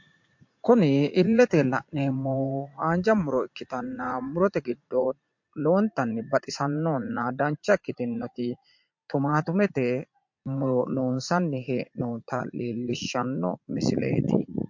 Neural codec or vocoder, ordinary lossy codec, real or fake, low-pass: vocoder, 44.1 kHz, 80 mel bands, Vocos; MP3, 48 kbps; fake; 7.2 kHz